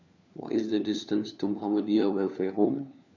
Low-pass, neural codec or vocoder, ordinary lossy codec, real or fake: 7.2 kHz; codec, 16 kHz, 4 kbps, FunCodec, trained on LibriTTS, 50 frames a second; none; fake